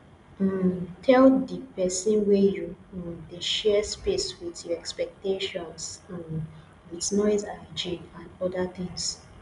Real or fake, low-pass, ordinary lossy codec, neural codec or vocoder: real; 10.8 kHz; none; none